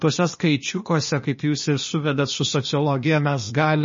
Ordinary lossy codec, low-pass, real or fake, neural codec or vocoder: MP3, 32 kbps; 7.2 kHz; fake; codec, 16 kHz, 0.8 kbps, ZipCodec